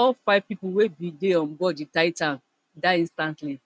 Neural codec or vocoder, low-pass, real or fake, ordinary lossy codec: none; none; real; none